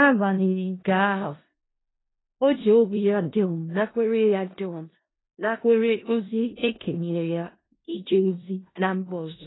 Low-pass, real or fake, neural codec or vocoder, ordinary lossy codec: 7.2 kHz; fake; codec, 16 kHz in and 24 kHz out, 0.4 kbps, LongCat-Audio-Codec, four codebook decoder; AAC, 16 kbps